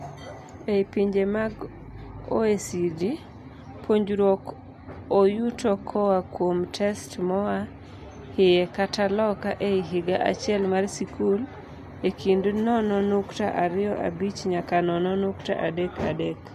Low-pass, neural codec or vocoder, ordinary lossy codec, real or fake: 14.4 kHz; none; MP3, 64 kbps; real